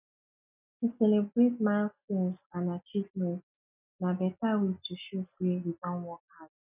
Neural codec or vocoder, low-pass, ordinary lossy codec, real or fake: none; 3.6 kHz; none; real